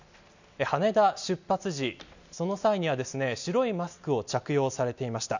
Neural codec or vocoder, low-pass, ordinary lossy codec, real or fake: none; 7.2 kHz; none; real